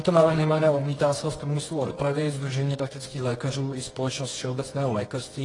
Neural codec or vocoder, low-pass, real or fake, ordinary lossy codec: codec, 24 kHz, 0.9 kbps, WavTokenizer, medium music audio release; 10.8 kHz; fake; AAC, 32 kbps